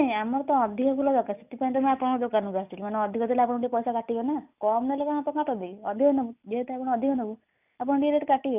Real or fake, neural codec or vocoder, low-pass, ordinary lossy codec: real; none; 3.6 kHz; none